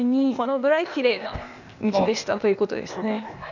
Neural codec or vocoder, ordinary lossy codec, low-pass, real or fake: codec, 16 kHz, 0.8 kbps, ZipCodec; none; 7.2 kHz; fake